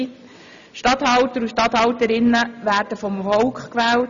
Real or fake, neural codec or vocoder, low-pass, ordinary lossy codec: real; none; 7.2 kHz; none